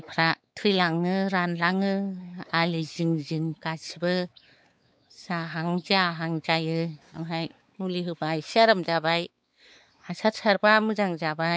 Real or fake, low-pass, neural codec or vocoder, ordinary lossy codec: fake; none; codec, 16 kHz, 4 kbps, X-Codec, WavLM features, trained on Multilingual LibriSpeech; none